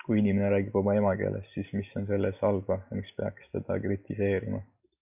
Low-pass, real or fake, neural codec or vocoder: 3.6 kHz; real; none